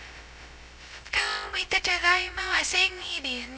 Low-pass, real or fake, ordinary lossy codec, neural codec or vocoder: none; fake; none; codec, 16 kHz, 0.2 kbps, FocalCodec